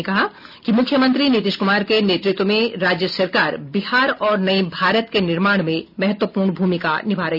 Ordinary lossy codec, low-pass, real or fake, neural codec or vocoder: none; 5.4 kHz; real; none